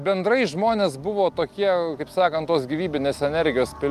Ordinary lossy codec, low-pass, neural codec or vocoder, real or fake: Opus, 32 kbps; 14.4 kHz; none; real